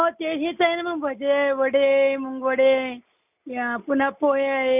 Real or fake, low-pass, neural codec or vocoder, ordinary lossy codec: real; 3.6 kHz; none; none